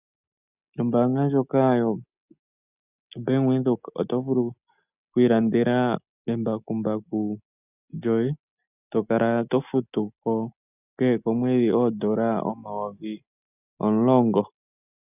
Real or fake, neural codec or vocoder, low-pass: real; none; 3.6 kHz